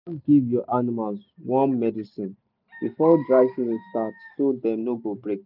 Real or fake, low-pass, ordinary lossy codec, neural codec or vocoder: real; 5.4 kHz; none; none